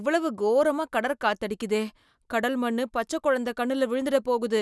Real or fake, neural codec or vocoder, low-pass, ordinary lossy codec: real; none; none; none